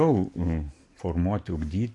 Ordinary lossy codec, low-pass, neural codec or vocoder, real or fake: AAC, 48 kbps; 10.8 kHz; none; real